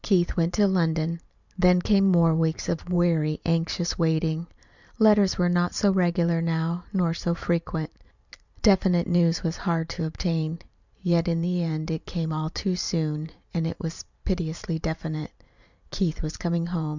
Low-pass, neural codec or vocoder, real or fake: 7.2 kHz; vocoder, 44.1 kHz, 128 mel bands every 512 samples, BigVGAN v2; fake